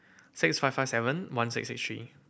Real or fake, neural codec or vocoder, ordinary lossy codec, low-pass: real; none; none; none